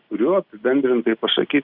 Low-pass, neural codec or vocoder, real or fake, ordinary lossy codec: 5.4 kHz; none; real; Opus, 64 kbps